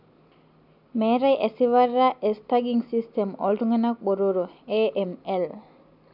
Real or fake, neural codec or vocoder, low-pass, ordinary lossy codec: real; none; 5.4 kHz; AAC, 48 kbps